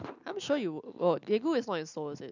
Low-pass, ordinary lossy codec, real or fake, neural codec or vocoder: 7.2 kHz; none; real; none